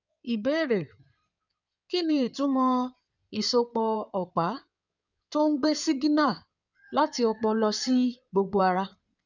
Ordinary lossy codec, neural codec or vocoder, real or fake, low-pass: none; codec, 16 kHz in and 24 kHz out, 2.2 kbps, FireRedTTS-2 codec; fake; 7.2 kHz